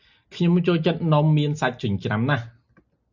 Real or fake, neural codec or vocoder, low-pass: real; none; 7.2 kHz